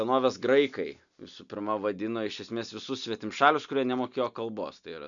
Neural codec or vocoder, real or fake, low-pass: none; real; 7.2 kHz